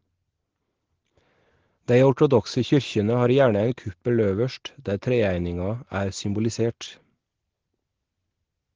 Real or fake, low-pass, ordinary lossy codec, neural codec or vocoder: real; 7.2 kHz; Opus, 16 kbps; none